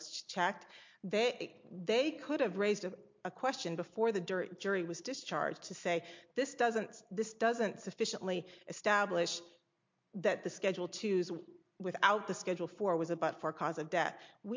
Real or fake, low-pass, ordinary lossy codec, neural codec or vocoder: real; 7.2 kHz; MP3, 48 kbps; none